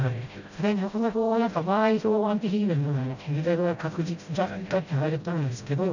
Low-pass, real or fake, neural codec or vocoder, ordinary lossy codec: 7.2 kHz; fake; codec, 16 kHz, 0.5 kbps, FreqCodec, smaller model; none